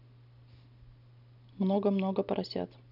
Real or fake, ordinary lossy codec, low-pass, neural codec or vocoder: real; none; 5.4 kHz; none